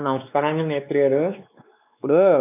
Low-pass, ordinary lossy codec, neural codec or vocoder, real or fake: 3.6 kHz; none; codec, 16 kHz, 2 kbps, X-Codec, WavLM features, trained on Multilingual LibriSpeech; fake